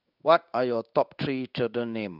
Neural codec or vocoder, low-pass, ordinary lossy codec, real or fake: codec, 16 kHz in and 24 kHz out, 1 kbps, XY-Tokenizer; 5.4 kHz; none; fake